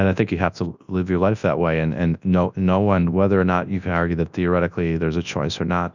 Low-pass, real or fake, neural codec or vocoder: 7.2 kHz; fake; codec, 24 kHz, 0.9 kbps, WavTokenizer, large speech release